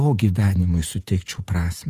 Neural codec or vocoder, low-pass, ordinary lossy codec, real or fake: vocoder, 48 kHz, 128 mel bands, Vocos; 14.4 kHz; Opus, 24 kbps; fake